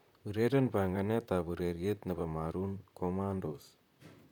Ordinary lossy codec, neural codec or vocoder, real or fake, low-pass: none; vocoder, 44.1 kHz, 128 mel bands, Pupu-Vocoder; fake; 19.8 kHz